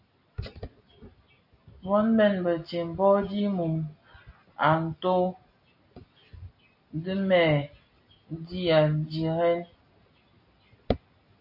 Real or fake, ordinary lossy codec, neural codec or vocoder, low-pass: real; AAC, 48 kbps; none; 5.4 kHz